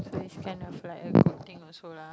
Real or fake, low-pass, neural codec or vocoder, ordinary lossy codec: real; none; none; none